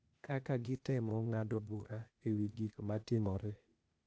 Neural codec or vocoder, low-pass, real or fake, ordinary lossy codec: codec, 16 kHz, 0.8 kbps, ZipCodec; none; fake; none